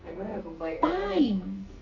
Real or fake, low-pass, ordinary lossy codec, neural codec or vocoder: fake; 7.2 kHz; none; codec, 44.1 kHz, 2.6 kbps, SNAC